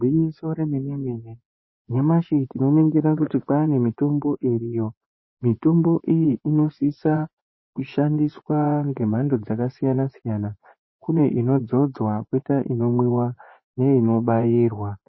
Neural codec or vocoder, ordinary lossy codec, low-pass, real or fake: vocoder, 24 kHz, 100 mel bands, Vocos; MP3, 24 kbps; 7.2 kHz; fake